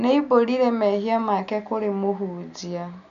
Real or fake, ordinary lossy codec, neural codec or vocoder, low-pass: real; none; none; 7.2 kHz